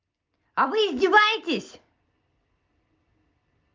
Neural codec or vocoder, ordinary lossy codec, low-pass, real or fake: none; Opus, 32 kbps; 7.2 kHz; real